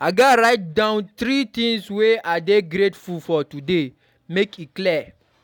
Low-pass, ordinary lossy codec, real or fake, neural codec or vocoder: 19.8 kHz; none; real; none